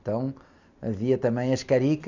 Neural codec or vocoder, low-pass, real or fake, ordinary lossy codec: none; 7.2 kHz; real; none